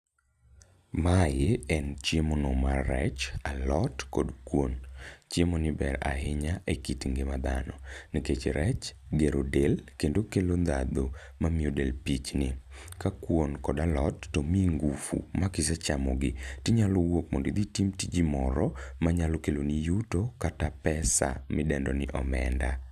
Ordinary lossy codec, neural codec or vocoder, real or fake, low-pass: none; none; real; 14.4 kHz